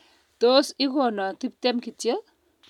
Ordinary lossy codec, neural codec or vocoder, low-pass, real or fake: none; none; 19.8 kHz; real